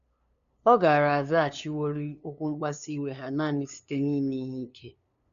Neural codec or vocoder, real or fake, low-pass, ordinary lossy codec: codec, 16 kHz, 2 kbps, FunCodec, trained on LibriTTS, 25 frames a second; fake; 7.2 kHz; none